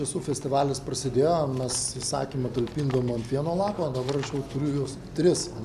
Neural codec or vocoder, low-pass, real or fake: none; 14.4 kHz; real